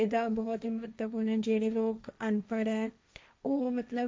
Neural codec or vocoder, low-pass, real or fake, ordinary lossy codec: codec, 16 kHz, 1.1 kbps, Voila-Tokenizer; none; fake; none